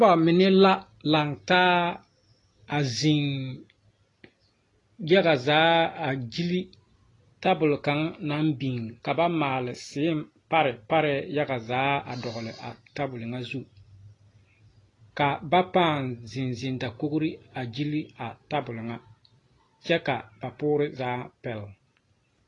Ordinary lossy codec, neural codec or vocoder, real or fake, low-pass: AAC, 32 kbps; none; real; 10.8 kHz